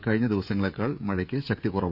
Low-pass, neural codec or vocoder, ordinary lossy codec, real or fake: 5.4 kHz; codec, 16 kHz, 16 kbps, FreqCodec, smaller model; none; fake